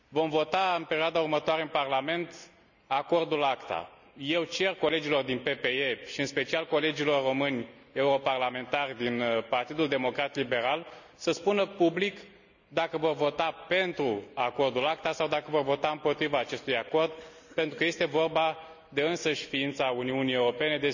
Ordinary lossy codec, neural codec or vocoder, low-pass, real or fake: none; none; 7.2 kHz; real